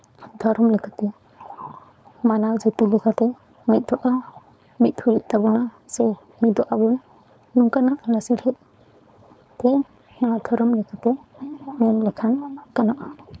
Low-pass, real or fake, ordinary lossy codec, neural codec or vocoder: none; fake; none; codec, 16 kHz, 4.8 kbps, FACodec